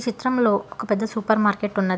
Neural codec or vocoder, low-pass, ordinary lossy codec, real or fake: none; none; none; real